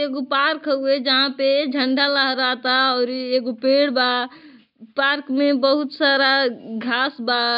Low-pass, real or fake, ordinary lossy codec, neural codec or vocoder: 5.4 kHz; real; none; none